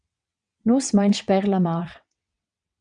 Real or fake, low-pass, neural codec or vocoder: fake; 9.9 kHz; vocoder, 22.05 kHz, 80 mel bands, WaveNeXt